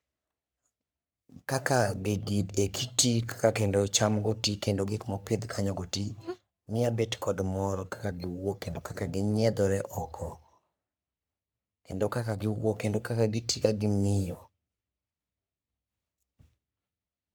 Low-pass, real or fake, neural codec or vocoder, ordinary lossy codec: none; fake; codec, 44.1 kHz, 3.4 kbps, Pupu-Codec; none